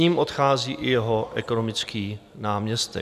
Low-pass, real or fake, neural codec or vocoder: 14.4 kHz; real; none